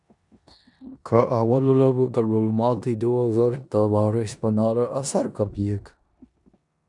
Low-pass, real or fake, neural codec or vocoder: 10.8 kHz; fake; codec, 16 kHz in and 24 kHz out, 0.9 kbps, LongCat-Audio-Codec, four codebook decoder